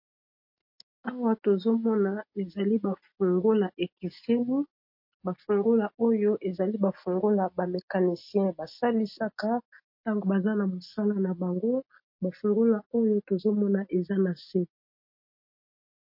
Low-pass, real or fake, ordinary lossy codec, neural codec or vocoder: 5.4 kHz; real; MP3, 32 kbps; none